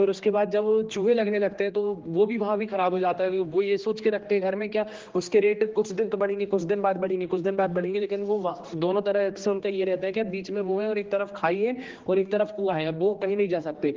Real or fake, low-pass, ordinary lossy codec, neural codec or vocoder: fake; 7.2 kHz; Opus, 16 kbps; codec, 16 kHz, 2 kbps, X-Codec, HuBERT features, trained on general audio